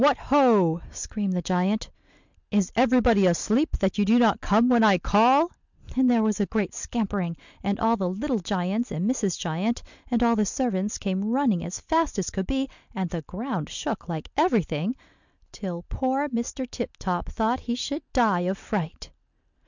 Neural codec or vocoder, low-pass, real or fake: none; 7.2 kHz; real